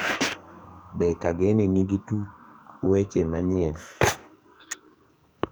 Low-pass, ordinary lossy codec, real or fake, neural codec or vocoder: none; none; fake; codec, 44.1 kHz, 2.6 kbps, SNAC